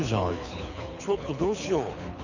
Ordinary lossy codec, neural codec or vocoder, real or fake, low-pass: none; codec, 24 kHz, 6 kbps, HILCodec; fake; 7.2 kHz